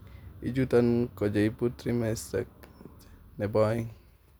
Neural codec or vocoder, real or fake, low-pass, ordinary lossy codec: none; real; none; none